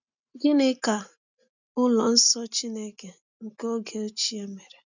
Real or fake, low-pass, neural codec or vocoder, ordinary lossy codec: real; 7.2 kHz; none; none